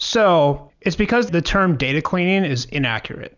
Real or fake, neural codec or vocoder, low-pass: real; none; 7.2 kHz